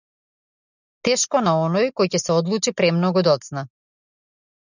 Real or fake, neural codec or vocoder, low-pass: real; none; 7.2 kHz